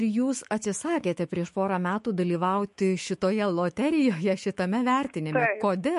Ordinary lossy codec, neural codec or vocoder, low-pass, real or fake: MP3, 48 kbps; autoencoder, 48 kHz, 128 numbers a frame, DAC-VAE, trained on Japanese speech; 14.4 kHz; fake